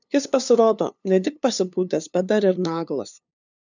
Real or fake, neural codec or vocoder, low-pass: fake; codec, 16 kHz, 2 kbps, FunCodec, trained on LibriTTS, 25 frames a second; 7.2 kHz